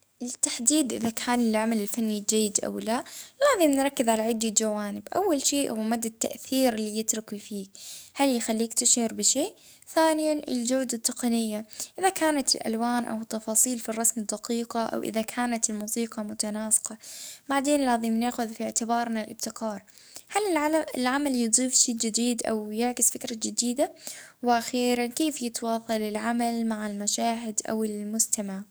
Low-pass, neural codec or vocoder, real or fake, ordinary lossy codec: none; codec, 44.1 kHz, 7.8 kbps, DAC; fake; none